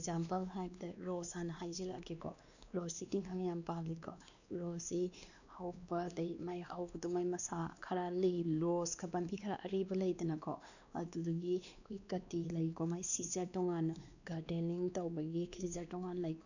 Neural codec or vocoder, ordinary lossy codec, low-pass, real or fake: codec, 16 kHz, 2 kbps, X-Codec, WavLM features, trained on Multilingual LibriSpeech; none; 7.2 kHz; fake